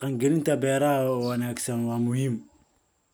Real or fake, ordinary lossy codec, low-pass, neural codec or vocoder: real; none; none; none